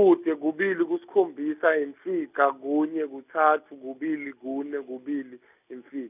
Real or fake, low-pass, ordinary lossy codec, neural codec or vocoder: real; 3.6 kHz; none; none